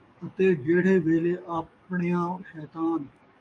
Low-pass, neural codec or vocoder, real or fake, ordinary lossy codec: 9.9 kHz; codec, 44.1 kHz, 7.8 kbps, DAC; fake; AAC, 64 kbps